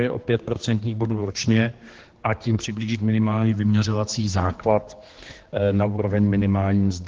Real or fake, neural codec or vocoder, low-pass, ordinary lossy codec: fake; codec, 16 kHz, 2 kbps, X-Codec, HuBERT features, trained on general audio; 7.2 kHz; Opus, 16 kbps